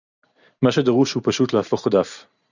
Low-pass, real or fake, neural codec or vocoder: 7.2 kHz; real; none